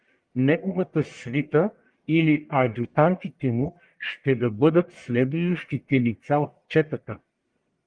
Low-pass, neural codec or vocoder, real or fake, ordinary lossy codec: 9.9 kHz; codec, 44.1 kHz, 1.7 kbps, Pupu-Codec; fake; Opus, 32 kbps